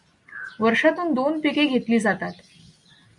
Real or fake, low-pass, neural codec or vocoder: real; 10.8 kHz; none